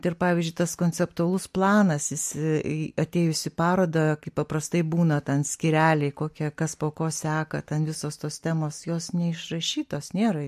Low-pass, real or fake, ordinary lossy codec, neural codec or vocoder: 14.4 kHz; real; MP3, 64 kbps; none